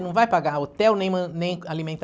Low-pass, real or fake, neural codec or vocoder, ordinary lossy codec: none; real; none; none